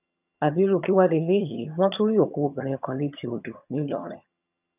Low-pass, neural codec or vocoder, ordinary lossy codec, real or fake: 3.6 kHz; vocoder, 22.05 kHz, 80 mel bands, HiFi-GAN; none; fake